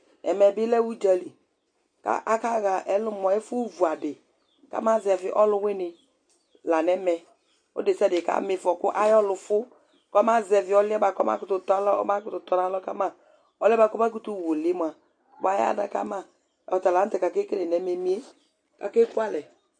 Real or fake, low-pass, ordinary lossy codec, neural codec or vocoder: real; 9.9 kHz; MP3, 48 kbps; none